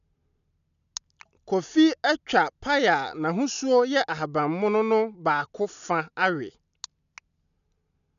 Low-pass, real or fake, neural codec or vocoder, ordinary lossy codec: 7.2 kHz; real; none; none